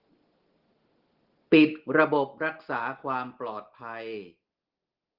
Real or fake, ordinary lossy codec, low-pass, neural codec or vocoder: real; Opus, 16 kbps; 5.4 kHz; none